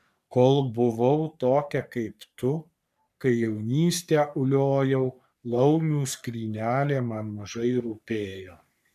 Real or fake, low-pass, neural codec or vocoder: fake; 14.4 kHz; codec, 44.1 kHz, 3.4 kbps, Pupu-Codec